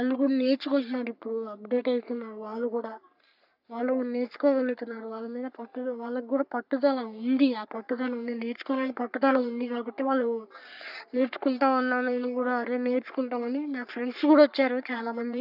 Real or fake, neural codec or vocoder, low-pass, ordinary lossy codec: fake; codec, 44.1 kHz, 3.4 kbps, Pupu-Codec; 5.4 kHz; none